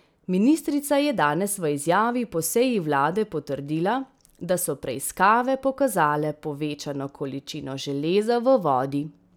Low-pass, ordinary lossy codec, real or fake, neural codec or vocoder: none; none; real; none